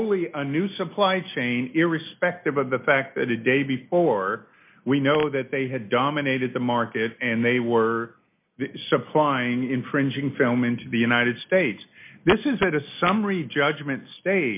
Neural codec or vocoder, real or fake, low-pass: none; real; 3.6 kHz